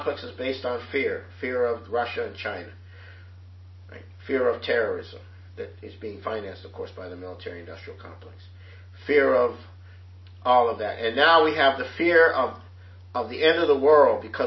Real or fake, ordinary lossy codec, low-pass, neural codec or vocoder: real; MP3, 24 kbps; 7.2 kHz; none